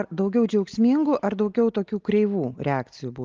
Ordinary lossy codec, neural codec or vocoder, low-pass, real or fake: Opus, 32 kbps; none; 7.2 kHz; real